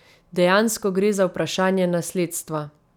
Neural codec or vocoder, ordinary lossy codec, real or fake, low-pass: none; none; real; 19.8 kHz